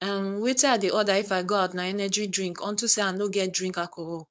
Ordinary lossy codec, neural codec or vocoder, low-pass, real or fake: none; codec, 16 kHz, 4.8 kbps, FACodec; none; fake